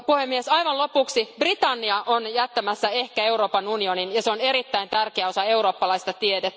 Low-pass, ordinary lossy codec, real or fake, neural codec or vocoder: none; none; real; none